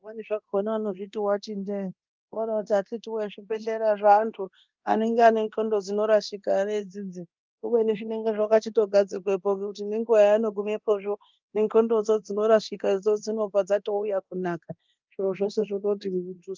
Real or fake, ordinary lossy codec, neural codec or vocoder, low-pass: fake; Opus, 24 kbps; codec, 24 kHz, 0.9 kbps, DualCodec; 7.2 kHz